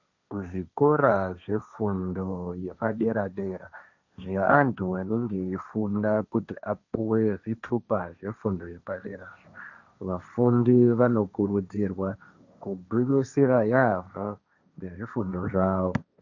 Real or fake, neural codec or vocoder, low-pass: fake; codec, 16 kHz, 1.1 kbps, Voila-Tokenizer; 7.2 kHz